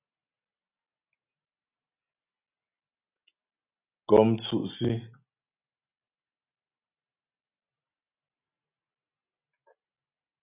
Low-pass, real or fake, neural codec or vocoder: 3.6 kHz; real; none